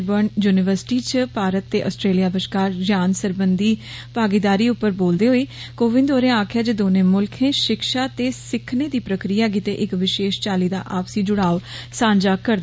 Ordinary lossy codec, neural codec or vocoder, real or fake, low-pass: none; none; real; none